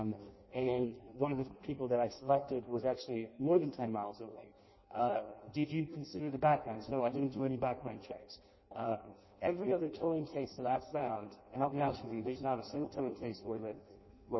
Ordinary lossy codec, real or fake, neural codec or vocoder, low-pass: MP3, 24 kbps; fake; codec, 16 kHz in and 24 kHz out, 0.6 kbps, FireRedTTS-2 codec; 7.2 kHz